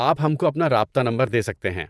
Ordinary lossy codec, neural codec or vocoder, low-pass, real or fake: none; vocoder, 24 kHz, 100 mel bands, Vocos; none; fake